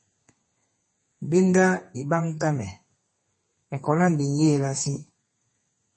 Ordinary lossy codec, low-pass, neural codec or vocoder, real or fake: MP3, 32 kbps; 10.8 kHz; codec, 32 kHz, 1.9 kbps, SNAC; fake